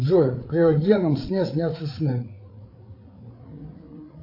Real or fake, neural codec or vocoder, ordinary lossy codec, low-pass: fake; codec, 16 kHz, 8 kbps, FreqCodec, larger model; AAC, 32 kbps; 5.4 kHz